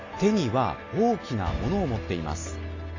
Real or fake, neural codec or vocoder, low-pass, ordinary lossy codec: real; none; 7.2 kHz; AAC, 32 kbps